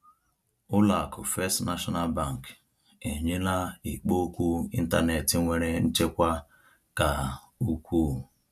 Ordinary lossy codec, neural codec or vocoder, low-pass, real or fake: none; none; 14.4 kHz; real